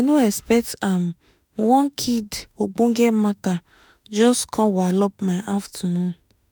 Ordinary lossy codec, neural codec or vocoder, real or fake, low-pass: none; autoencoder, 48 kHz, 32 numbers a frame, DAC-VAE, trained on Japanese speech; fake; none